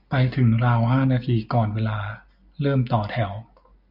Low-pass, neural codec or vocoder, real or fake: 5.4 kHz; none; real